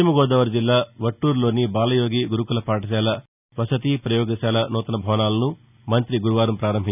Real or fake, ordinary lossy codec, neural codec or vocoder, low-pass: real; none; none; 3.6 kHz